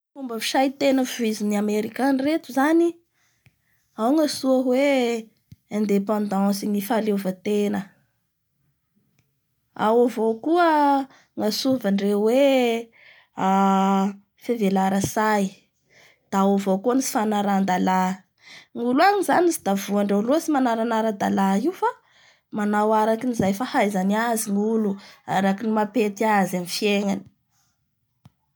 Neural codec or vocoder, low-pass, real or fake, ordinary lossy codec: none; none; real; none